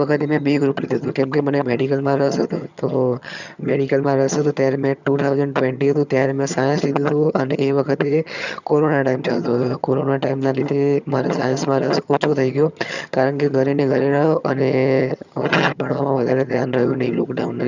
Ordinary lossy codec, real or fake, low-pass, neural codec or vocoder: none; fake; 7.2 kHz; vocoder, 22.05 kHz, 80 mel bands, HiFi-GAN